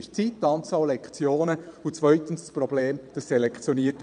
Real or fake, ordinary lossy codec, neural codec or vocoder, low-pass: fake; none; vocoder, 22.05 kHz, 80 mel bands, Vocos; 9.9 kHz